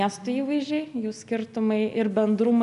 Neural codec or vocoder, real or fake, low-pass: none; real; 10.8 kHz